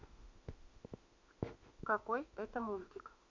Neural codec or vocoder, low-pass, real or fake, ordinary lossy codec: autoencoder, 48 kHz, 32 numbers a frame, DAC-VAE, trained on Japanese speech; 7.2 kHz; fake; MP3, 48 kbps